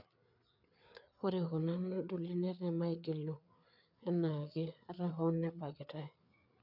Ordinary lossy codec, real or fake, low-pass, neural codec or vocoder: none; fake; 5.4 kHz; codec, 16 kHz, 4 kbps, FreqCodec, larger model